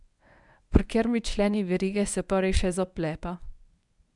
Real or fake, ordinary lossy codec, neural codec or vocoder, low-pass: fake; none; codec, 24 kHz, 0.9 kbps, WavTokenizer, medium speech release version 1; 10.8 kHz